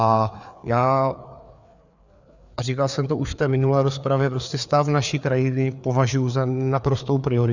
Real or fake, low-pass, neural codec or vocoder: fake; 7.2 kHz; codec, 16 kHz, 4 kbps, FreqCodec, larger model